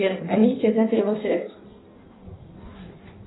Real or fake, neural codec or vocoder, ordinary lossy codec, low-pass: fake; codec, 16 kHz in and 24 kHz out, 1.1 kbps, FireRedTTS-2 codec; AAC, 16 kbps; 7.2 kHz